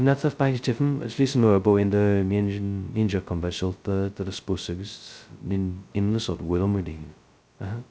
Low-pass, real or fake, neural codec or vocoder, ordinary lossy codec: none; fake; codec, 16 kHz, 0.2 kbps, FocalCodec; none